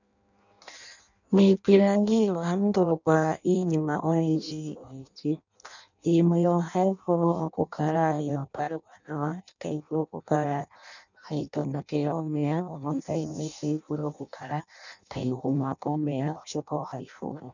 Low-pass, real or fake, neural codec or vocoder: 7.2 kHz; fake; codec, 16 kHz in and 24 kHz out, 0.6 kbps, FireRedTTS-2 codec